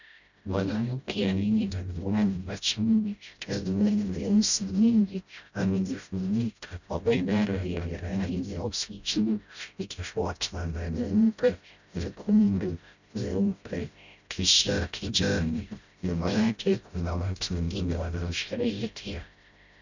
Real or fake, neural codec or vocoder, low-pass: fake; codec, 16 kHz, 0.5 kbps, FreqCodec, smaller model; 7.2 kHz